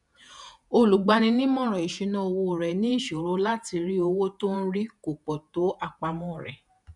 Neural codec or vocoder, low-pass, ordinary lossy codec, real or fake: vocoder, 48 kHz, 128 mel bands, Vocos; 10.8 kHz; none; fake